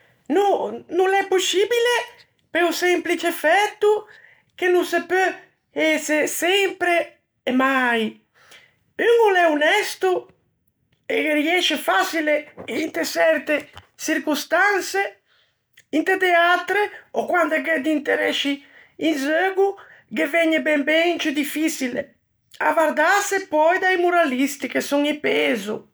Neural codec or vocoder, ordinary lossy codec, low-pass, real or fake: none; none; none; real